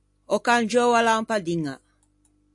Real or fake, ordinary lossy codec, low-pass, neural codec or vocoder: real; AAC, 64 kbps; 10.8 kHz; none